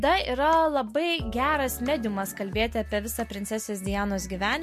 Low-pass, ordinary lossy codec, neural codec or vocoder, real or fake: 14.4 kHz; MP3, 64 kbps; none; real